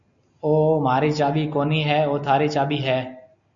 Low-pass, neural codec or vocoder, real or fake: 7.2 kHz; none; real